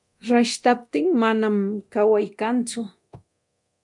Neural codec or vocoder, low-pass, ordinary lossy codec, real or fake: codec, 24 kHz, 0.9 kbps, DualCodec; 10.8 kHz; MP3, 64 kbps; fake